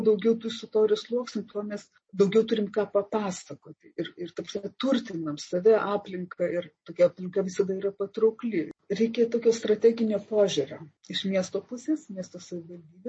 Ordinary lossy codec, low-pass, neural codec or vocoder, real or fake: MP3, 32 kbps; 9.9 kHz; none; real